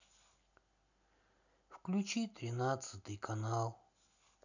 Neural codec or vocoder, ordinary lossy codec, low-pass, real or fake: none; none; 7.2 kHz; real